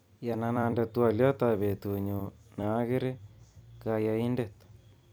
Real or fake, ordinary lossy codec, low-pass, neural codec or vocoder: fake; none; none; vocoder, 44.1 kHz, 128 mel bands every 256 samples, BigVGAN v2